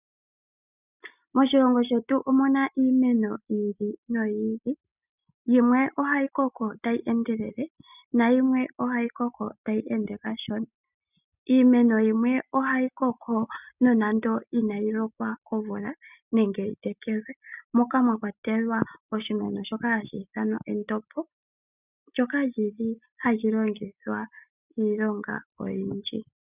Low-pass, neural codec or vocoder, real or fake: 3.6 kHz; none; real